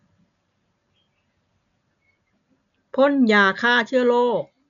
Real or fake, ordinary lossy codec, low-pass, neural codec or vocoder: real; none; 7.2 kHz; none